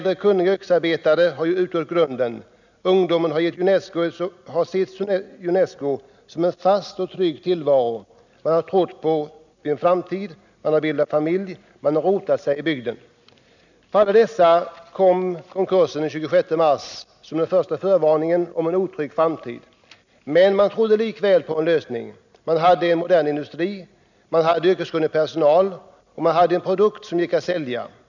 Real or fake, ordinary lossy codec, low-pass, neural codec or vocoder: real; none; 7.2 kHz; none